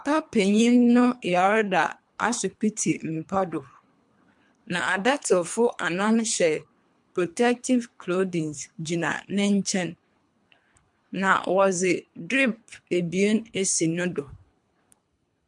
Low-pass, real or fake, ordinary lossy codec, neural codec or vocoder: 10.8 kHz; fake; MP3, 64 kbps; codec, 24 kHz, 3 kbps, HILCodec